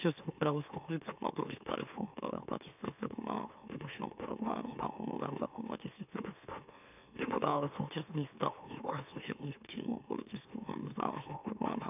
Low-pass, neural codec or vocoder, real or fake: 3.6 kHz; autoencoder, 44.1 kHz, a latent of 192 numbers a frame, MeloTTS; fake